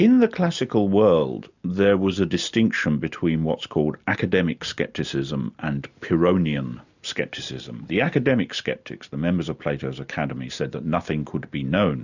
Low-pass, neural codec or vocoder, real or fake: 7.2 kHz; none; real